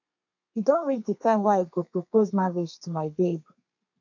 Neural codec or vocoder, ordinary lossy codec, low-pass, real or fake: codec, 32 kHz, 1.9 kbps, SNAC; MP3, 64 kbps; 7.2 kHz; fake